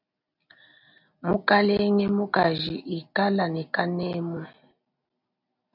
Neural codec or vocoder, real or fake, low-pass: none; real; 5.4 kHz